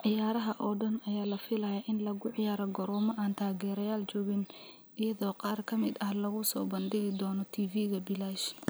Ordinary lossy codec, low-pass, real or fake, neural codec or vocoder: none; none; real; none